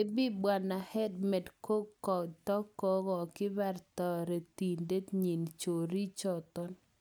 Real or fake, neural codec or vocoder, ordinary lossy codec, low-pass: real; none; none; none